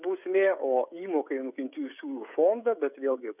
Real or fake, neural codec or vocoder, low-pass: fake; codec, 16 kHz, 16 kbps, FreqCodec, smaller model; 3.6 kHz